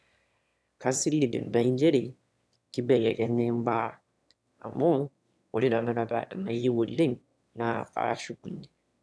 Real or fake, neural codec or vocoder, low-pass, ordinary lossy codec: fake; autoencoder, 22.05 kHz, a latent of 192 numbers a frame, VITS, trained on one speaker; none; none